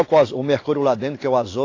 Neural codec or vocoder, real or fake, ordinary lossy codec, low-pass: none; real; AAC, 32 kbps; 7.2 kHz